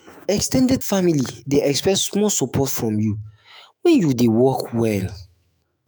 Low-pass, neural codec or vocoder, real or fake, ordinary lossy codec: none; autoencoder, 48 kHz, 128 numbers a frame, DAC-VAE, trained on Japanese speech; fake; none